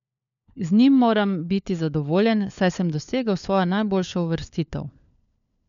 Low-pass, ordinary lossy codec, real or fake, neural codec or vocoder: 7.2 kHz; none; fake; codec, 16 kHz, 4 kbps, FunCodec, trained on LibriTTS, 50 frames a second